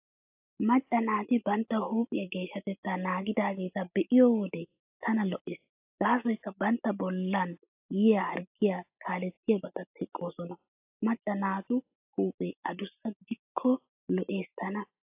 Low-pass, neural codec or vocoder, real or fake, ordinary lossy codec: 3.6 kHz; none; real; AAC, 32 kbps